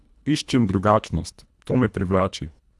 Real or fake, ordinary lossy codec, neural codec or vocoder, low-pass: fake; none; codec, 24 kHz, 1.5 kbps, HILCodec; none